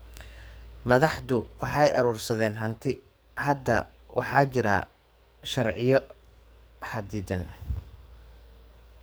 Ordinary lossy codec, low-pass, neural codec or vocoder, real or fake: none; none; codec, 44.1 kHz, 2.6 kbps, SNAC; fake